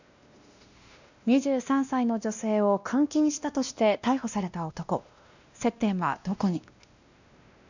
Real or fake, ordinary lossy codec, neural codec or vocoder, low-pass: fake; none; codec, 16 kHz, 1 kbps, X-Codec, WavLM features, trained on Multilingual LibriSpeech; 7.2 kHz